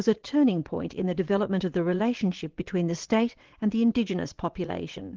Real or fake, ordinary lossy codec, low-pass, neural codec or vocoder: fake; Opus, 16 kbps; 7.2 kHz; vocoder, 22.05 kHz, 80 mel bands, WaveNeXt